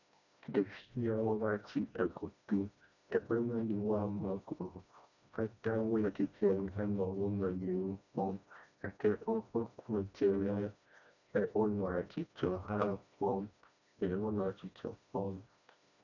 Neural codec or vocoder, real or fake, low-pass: codec, 16 kHz, 1 kbps, FreqCodec, smaller model; fake; 7.2 kHz